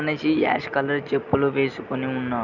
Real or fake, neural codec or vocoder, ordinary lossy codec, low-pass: real; none; none; 7.2 kHz